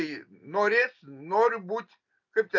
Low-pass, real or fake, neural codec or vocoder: 7.2 kHz; real; none